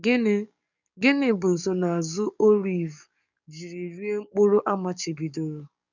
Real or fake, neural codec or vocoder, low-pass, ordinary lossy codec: fake; codec, 44.1 kHz, 7.8 kbps, DAC; 7.2 kHz; none